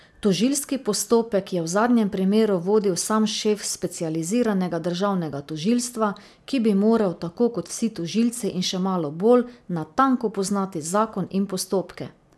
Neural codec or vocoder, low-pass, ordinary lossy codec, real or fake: none; none; none; real